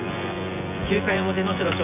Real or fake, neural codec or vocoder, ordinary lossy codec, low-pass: fake; vocoder, 24 kHz, 100 mel bands, Vocos; none; 3.6 kHz